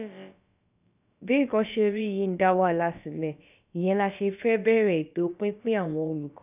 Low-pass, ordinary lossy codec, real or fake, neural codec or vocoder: 3.6 kHz; none; fake; codec, 16 kHz, about 1 kbps, DyCAST, with the encoder's durations